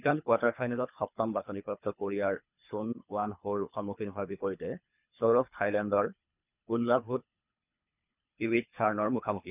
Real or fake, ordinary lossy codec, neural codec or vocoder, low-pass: fake; none; codec, 24 kHz, 3 kbps, HILCodec; 3.6 kHz